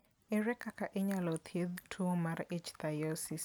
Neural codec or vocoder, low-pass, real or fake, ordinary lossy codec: none; none; real; none